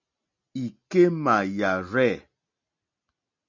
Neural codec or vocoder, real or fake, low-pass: none; real; 7.2 kHz